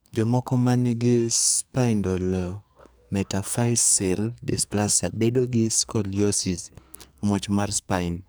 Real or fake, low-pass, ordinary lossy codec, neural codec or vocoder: fake; none; none; codec, 44.1 kHz, 2.6 kbps, SNAC